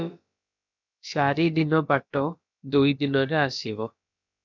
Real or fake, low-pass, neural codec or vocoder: fake; 7.2 kHz; codec, 16 kHz, about 1 kbps, DyCAST, with the encoder's durations